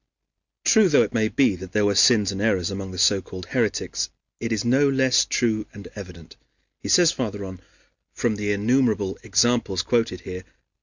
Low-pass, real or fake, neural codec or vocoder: 7.2 kHz; real; none